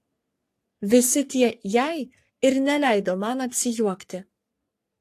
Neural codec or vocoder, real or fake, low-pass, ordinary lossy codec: codec, 44.1 kHz, 3.4 kbps, Pupu-Codec; fake; 14.4 kHz; AAC, 64 kbps